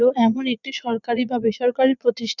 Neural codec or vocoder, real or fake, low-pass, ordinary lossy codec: vocoder, 22.05 kHz, 80 mel bands, Vocos; fake; 7.2 kHz; none